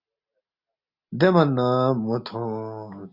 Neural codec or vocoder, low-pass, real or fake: none; 5.4 kHz; real